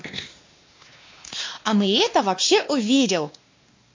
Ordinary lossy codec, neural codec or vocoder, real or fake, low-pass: MP3, 48 kbps; codec, 16 kHz, 2 kbps, X-Codec, WavLM features, trained on Multilingual LibriSpeech; fake; 7.2 kHz